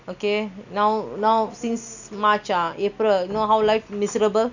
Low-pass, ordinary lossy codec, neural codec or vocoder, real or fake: 7.2 kHz; none; none; real